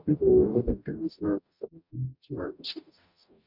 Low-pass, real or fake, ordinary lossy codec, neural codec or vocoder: 5.4 kHz; fake; none; codec, 44.1 kHz, 0.9 kbps, DAC